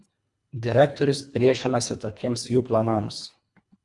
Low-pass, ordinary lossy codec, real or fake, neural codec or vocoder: 10.8 kHz; Opus, 64 kbps; fake; codec, 24 kHz, 1.5 kbps, HILCodec